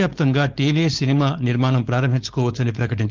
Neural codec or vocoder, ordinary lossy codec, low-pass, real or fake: codec, 16 kHz, 4.8 kbps, FACodec; Opus, 32 kbps; 7.2 kHz; fake